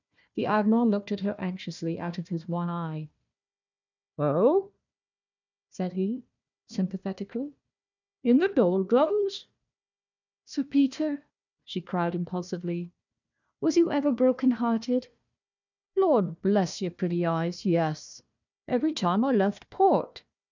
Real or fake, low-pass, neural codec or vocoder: fake; 7.2 kHz; codec, 16 kHz, 1 kbps, FunCodec, trained on Chinese and English, 50 frames a second